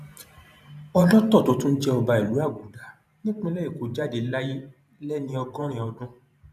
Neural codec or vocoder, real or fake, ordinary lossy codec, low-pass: vocoder, 44.1 kHz, 128 mel bands every 512 samples, BigVGAN v2; fake; none; 14.4 kHz